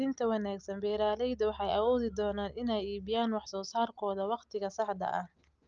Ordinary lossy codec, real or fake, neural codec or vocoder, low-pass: Opus, 24 kbps; real; none; 7.2 kHz